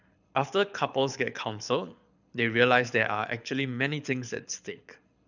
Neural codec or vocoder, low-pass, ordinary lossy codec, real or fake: codec, 24 kHz, 6 kbps, HILCodec; 7.2 kHz; none; fake